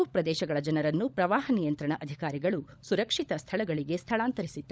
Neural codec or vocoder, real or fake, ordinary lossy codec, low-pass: codec, 16 kHz, 16 kbps, FunCodec, trained on LibriTTS, 50 frames a second; fake; none; none